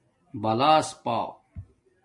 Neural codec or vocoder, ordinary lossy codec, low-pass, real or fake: none; MP3, 48 kbps; 10.8 kHz; real